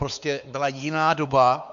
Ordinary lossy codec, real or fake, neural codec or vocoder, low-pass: MP3, 96 kbps; fake; codec, 16 kHz, 4 kbps, X-Codec, WavLM features, trained on Multilingual LibriSpeech; 7.2 kHz